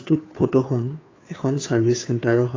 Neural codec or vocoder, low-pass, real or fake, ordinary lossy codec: codec, 16 kHz, 2 kbps, FunCodec, trained on Chinese and English, 25 frames a second; 7.2 kHz; fake; AAC, 32 kbps